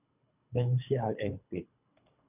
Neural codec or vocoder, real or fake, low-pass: codec, 24 kHz, 3 kbps, HILCodec; fake; 3.6 kHz